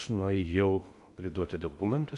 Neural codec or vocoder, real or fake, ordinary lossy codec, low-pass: codec, 16 kHz in and 24 kHz out, 0.6 kbps, FocalCodec, streaming, 2048 codes; fake; MP3, 96 kbps; 10.8 kHz